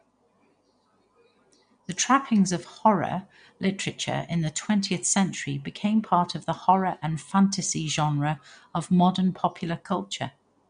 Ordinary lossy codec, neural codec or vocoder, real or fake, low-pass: MP3, 64 kbps; none; real; 9.9 kHz